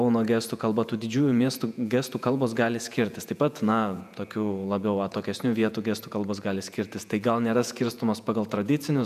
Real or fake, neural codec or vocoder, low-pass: fake; vocoder, 48 kHz, 128 mel bands, Vocos; 14.4 kHz